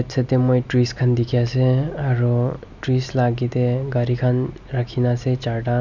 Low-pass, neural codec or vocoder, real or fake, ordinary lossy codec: 7.2 kHz; none; real; none